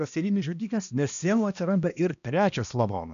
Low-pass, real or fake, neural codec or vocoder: 7.2 kHz; fake; codec, 16 kHz, 1 kbps, X-Codec, HuBERT features, trained on balanced general audio